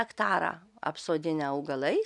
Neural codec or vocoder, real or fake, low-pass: none; real; 10.8 kHz